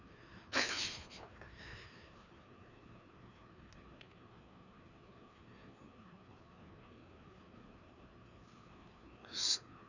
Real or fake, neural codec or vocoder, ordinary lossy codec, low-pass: fake; codec, 16 kHz, 2 kbps, FreqCodec, larger model; none; 7.2 kHz